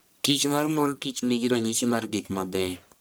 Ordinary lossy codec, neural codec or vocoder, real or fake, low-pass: none; codec, 44.1 kHz, 1.7 kbps, Pupu-Codec; fake; none